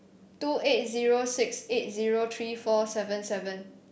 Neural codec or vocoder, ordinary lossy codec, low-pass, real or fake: none; none; none; real